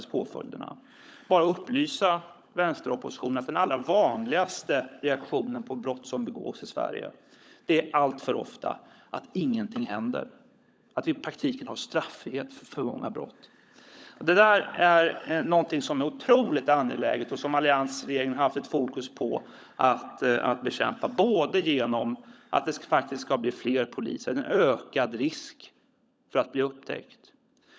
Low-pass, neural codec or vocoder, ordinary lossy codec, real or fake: none; codec, 16 kHz, 16 kbps, FunCodec, trained on LibriTTS, 50 frames a second; none; fake